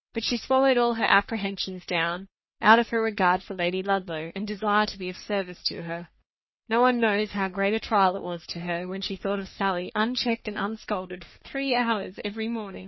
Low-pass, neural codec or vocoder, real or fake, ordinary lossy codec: 7.2 kHz; codec, 44.1 kHz, 3.4 kbps, Pupu-Codec; fake; MP3, 24 kbps